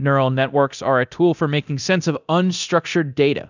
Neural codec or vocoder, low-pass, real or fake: codec, 24 kHz, 0.9 kbps, DualCodec; 7.2 kHz; fake